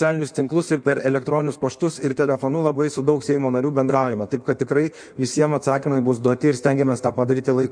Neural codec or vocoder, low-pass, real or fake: codec, 16 kHz in and 24 kHz out, 1.1 kbps, FireRedTTS-2 codec; 9.9 kHz; fake